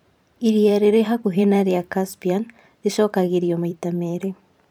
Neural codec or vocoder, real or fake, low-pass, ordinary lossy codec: vocoder, 44.1 kHz, 128 mel bands every 512 samples, BigVGAN v2; fake; 19.8 kHz; none